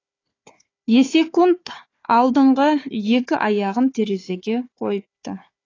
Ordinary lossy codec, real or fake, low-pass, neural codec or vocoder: AAC, 32 kbps; fake; 7.2 kHz; codec, 16 kHz, 4 kbps, FunCodec, trained on Chinese and English, 50 frames a second